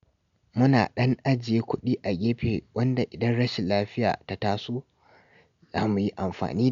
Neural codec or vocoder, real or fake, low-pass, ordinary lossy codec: none; real; 7.2 kHz; none